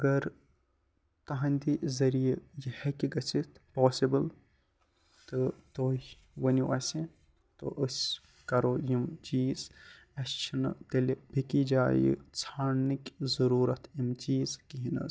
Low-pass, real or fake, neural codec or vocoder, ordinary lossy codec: none; real; none; none